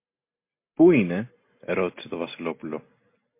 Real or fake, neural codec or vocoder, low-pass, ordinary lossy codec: real; none; 3.6 kHz; MP3, 24 kbps